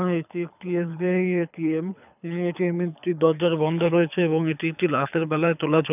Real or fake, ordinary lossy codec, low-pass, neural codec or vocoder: fake; none; 3.6 kHz; codec, 24 kHz, 6 kbps, HILCodec